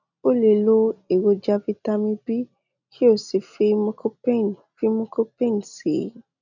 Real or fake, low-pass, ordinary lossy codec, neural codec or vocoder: real; 7.2 kHz; none; none